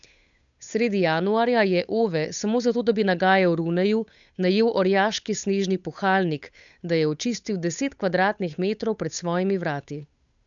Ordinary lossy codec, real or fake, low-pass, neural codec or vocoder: none; fake; 7.2 kHz; codec, 16 kHz, 8 kbps, FunCodec, trained on Chinese and English, 25 frames a second